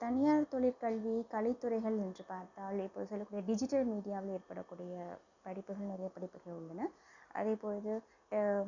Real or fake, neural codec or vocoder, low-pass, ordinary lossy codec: real; none; 7.2 kHz; none